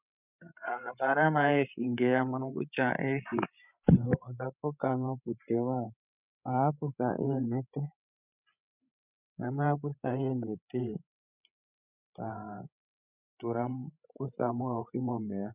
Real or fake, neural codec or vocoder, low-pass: fake; codec, 16 kHz, 8 kbps, FreqCodec, larger model; 3.6 kHz